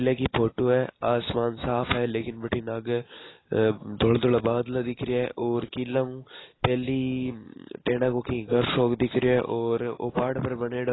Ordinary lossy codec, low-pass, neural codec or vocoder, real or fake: AAC, 16 kbps; 7.2 kHz; none; real